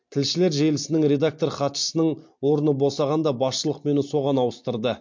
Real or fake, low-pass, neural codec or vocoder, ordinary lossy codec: real; 7.2 kHz; none; MP3, 48 kbps